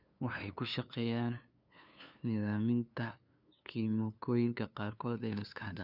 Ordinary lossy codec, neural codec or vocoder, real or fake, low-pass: none; codec, 16 kHz, 2 kbps, FunCodec, trained on LibriTTS, 25 frames a second; fake; 5.4 kHz